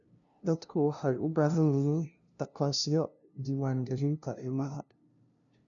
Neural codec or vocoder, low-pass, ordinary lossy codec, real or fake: codec, 16 kHz, 0.5 kbps, FunCodec, trained on LibriTTS, 25 frames a second; 7.2 kHz; none; fake